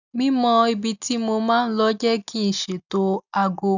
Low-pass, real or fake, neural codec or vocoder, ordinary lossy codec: 7.2 kHz; real; none; none